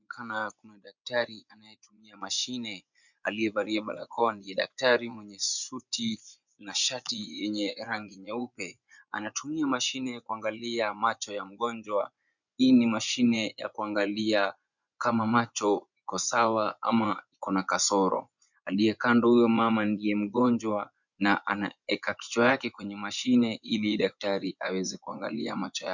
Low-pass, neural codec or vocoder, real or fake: 7.2 kHz; vocoder, 24 kHz, 100 mel bands, Vocos; fake